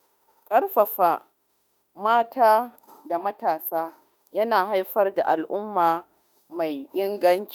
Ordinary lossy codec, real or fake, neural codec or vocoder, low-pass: none; fake; autoencoder, 48 kHz, 32 numbers a frame, DAC-VAE, trained on Japanese speech; none